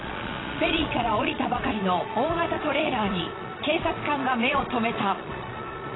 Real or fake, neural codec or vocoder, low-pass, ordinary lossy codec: fake; vocoder, 22.05 kHz, 80 mel bands, Vocos; 7.2 kHz; AAC, 16 kbps